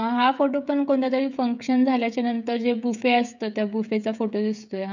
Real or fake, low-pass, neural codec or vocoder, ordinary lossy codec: fake; 7.2 kHz; codec, 16 kHz, 16 kbps, FreqCodec, smaller model; none